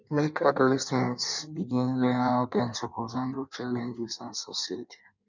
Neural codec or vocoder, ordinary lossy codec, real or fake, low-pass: codec, 16 kHz in and 24 kHz out, 1.1 kbps, FireRedTTS-2 codec; none; fake; 7.2 kHz